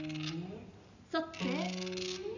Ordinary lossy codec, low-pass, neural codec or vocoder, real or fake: none; 7.2 kHz; none; real